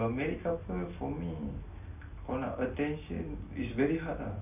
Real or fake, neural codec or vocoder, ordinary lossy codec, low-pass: real; none; none; 3.6 kHz